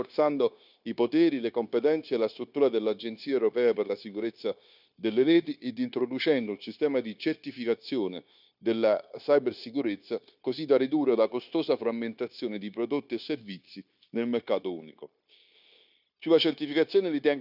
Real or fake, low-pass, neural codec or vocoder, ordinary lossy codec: fake; 5.4 kHz; codec, 16 kHz, 0.9 kbps, LongCat-Audio-Codec; none